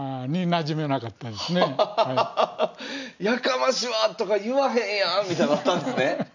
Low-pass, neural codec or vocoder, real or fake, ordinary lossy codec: 7.2 kHz; none; real; none